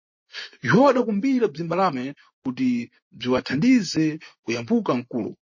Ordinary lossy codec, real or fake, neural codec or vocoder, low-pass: MP3, 32 kbps; fake; vocoder, 24 kHz, 100 mel bands, Vocos; 7.2 kHz